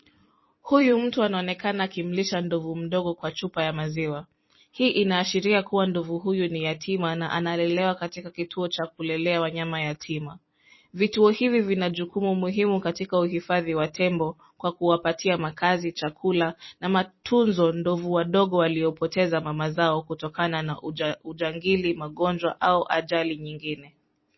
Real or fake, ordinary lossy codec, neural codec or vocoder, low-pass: fake; MP3, 24 kbps; vocoder, 44.1 kHz, 128 mel bands every 256 samples, BigVGAN v2; 7.2 kHz